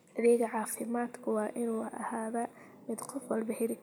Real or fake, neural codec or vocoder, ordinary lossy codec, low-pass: real; none; none; none